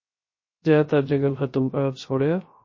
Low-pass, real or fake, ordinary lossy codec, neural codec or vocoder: 7.2 kHz; fake; MP3, 32 kbps; codec, 16 kHz, 0.3 kbps, FocalCodec